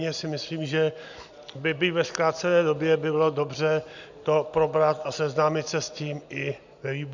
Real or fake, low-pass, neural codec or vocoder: real; 7.2 kHz; none